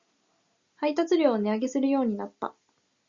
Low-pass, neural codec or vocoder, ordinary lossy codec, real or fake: 7.2 kHz; none; Opus, 64 kbps; real